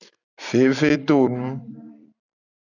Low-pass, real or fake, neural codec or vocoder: 7.2 kHz; fake; vocoder, 44.1 kHz, 128 mel bands every 256 samples, BigVGAN v2